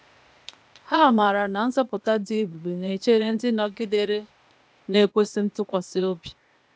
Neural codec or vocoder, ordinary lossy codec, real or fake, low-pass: codec, 16 kHz, 0.8 kbps, ZipCodec; none; fake; none